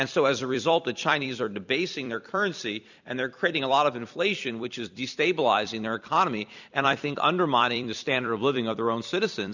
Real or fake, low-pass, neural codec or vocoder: fake; 7.2 kHz; vocoder, 44.1 kHz, 128 mel bands every 256 samples, BigVGAN v2